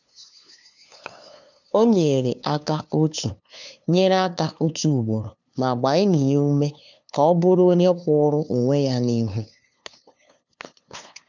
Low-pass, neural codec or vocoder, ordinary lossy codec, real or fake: 7.2 kHz; codec, 16 kHz, 2 kbps, FunCodec, trained on LibriTTS, 25 frames a second; none; fake